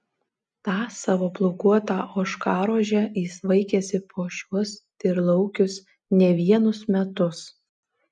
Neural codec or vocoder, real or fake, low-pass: none; real; 9.9 kHz